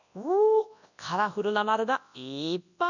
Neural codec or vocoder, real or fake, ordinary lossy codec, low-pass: codec, 24 kHz, 0.9 kbps, WavTokenizer, large speech release; fake; none; 7.2 kHz